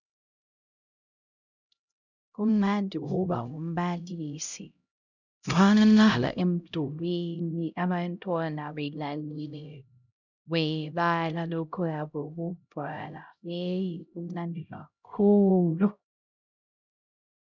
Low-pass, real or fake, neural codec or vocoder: 7.2 kHz; fake; codec, 16 kHz, 0.5 kbps, X-Codec, HuBERT features, trained on LibriSpeech